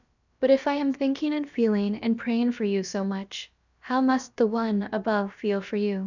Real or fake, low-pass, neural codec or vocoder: fake; 7.2 kHz; codec, 16 kHz, about 1 kbps, DyCAST, with the encoder's durations